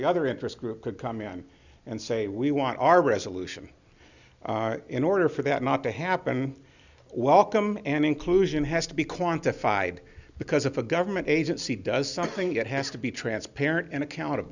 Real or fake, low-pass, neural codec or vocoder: real; 7.2 kHz; none